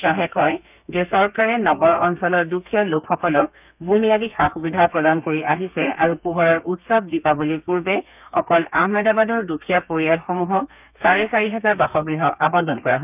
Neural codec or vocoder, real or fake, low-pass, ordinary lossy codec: codec, 32 kHz, 1.9 kbps, SNAC; fake; 3.6 kHz; none